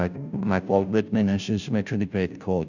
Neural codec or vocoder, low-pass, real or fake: codec, 16 kHz, 0.5 kbps, FunCodec, trained on Chinese and English, 25 frames a second; 7.2 kHz; fake